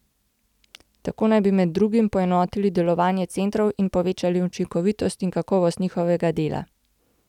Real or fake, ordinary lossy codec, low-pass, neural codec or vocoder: real; none; 19.8 kHz; none